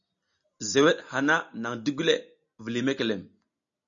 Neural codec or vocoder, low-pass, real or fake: none; 7.2 kHz; real